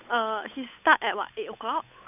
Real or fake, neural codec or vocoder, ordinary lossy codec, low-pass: fake; codec, 16 kHz, 8 kbps, FunCodec, trained on Chinese and English, 25 frames a second; none; 3.6 kHz